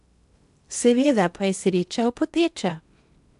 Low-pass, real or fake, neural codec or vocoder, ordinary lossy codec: 10.8 kHz; fake; codec, 16 kHz in and 24 kHz out, 0.8 kbps, FocalCodec, streaming, 65536 codes; AAC, 96 kbps